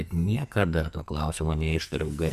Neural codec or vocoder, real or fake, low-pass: codec, 44.1 kHz, 2.6 kbps, SNAC; fake; 14.4 kHz